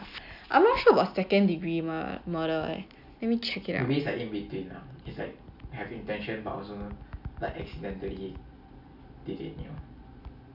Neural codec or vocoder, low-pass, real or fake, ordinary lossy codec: none; 5.4 kHz; real; none